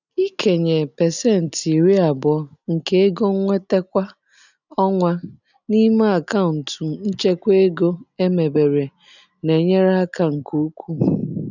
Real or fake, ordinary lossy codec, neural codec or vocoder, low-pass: real; none; none; 7.2 kHz